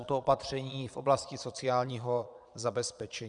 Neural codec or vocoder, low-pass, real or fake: vocoder, 22.05 kHz, 80 mel bands, Vocos; 9.9 kHz; fake